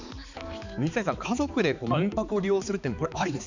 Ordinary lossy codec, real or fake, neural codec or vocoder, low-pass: none; fake; codec, 16 kHz, 4 kbps, X-Codec, HuBERT features, trained on balanced general audio; 7.2 kHz